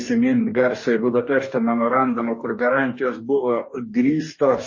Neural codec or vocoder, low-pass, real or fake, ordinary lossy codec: codec, 44.1 kHz, 2.6 kbps, DAC; 7.2 kHz; fake; MP3, 32 kbps